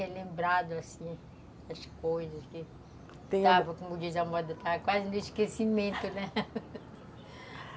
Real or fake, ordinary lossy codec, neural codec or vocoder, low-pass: real; none; none; none